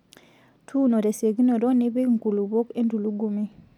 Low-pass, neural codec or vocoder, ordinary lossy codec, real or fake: 19.8 kHz; none; none; real